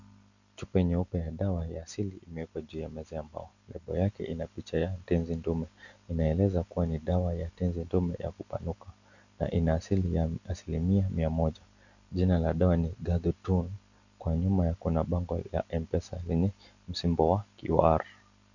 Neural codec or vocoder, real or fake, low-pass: none; real; 7.2 kHz